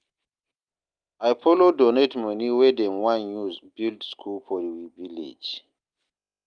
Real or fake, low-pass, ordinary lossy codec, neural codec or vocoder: real; 9.9 kHz; Opus, 32 kbps; none